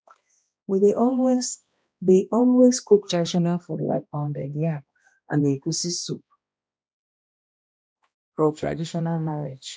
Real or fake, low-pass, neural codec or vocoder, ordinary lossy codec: fake; none; codec, 16 kHz, 1 kbps, X-Codec, HuBERT features, trained on balanced general audio; none